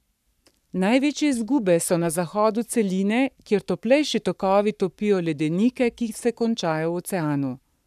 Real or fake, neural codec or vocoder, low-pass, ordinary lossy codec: fake; codec, 44.1 kHz, 7.8 kbps, Pupu-Codec; 14.4 kHz; none